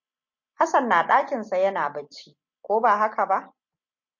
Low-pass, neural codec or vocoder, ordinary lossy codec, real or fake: 7.2 kHz; none; MP3, 48 kbps; real